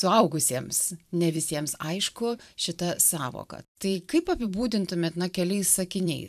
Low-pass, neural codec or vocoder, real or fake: 14.4 kHz; vocoder, 44.1 kHz, 128 mel bands every 512 samples, BigVGAN v2; fake